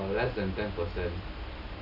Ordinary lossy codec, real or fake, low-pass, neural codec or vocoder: none; real; 5.4 kHz; none